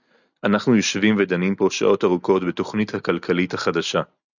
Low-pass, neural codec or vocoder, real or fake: 7.2 kHz; none; real